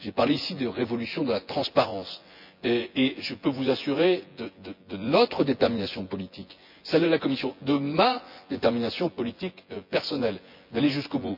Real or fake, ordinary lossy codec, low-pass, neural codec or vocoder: fake; MP3, 48 kbps; 5.4 kHz; vocoder, 24 kHz, 100 mel bands, Vocos